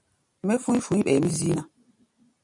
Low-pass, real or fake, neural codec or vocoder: 10.8 kHz; fake; vocoder, 44.1 kHz, 128 mel bands every 512 samples, BigVGAN v2